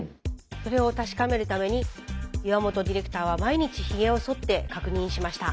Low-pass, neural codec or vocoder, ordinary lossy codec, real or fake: none; none; none; real